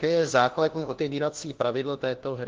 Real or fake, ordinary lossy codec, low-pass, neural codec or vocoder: fake; Opus, 16 kbps; 7.2 kHz; codec, 16 kHz, 1 kbps, FunCodec, trained on LibriTTS, 50 frames a second